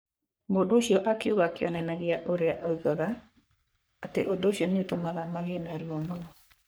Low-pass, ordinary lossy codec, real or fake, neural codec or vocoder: none; none; fake; codec, 44.1 kHz, 3.4 kbps, Pupu-Codec